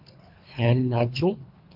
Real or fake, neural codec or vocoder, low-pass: fake; codec, 24 kHz, 3 kbps, HILCodec; 5.4 kHz